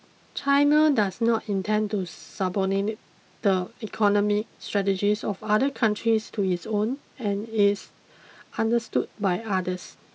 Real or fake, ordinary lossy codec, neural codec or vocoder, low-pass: real; none; none; none